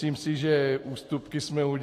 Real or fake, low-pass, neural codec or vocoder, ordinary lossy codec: real; 14.4 kHz; none; AAC, 48 kbps